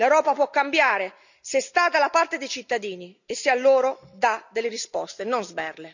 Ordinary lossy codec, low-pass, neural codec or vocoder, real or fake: none; 7.2 kHz; none; real